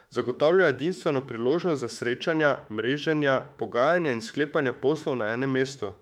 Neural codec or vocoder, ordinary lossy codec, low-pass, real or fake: autoencoder, 48 kHz, 32 numbers a frame, DAC-VAE, trained on Japanese speech; MP3, 96 kbps; 19.8 kHz; fake